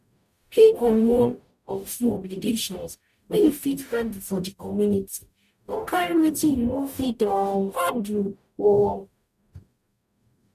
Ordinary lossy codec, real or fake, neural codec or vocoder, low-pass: none; fake; codec, 44.1 kHz, 0.9 kbps, DAC; 14.4 kHz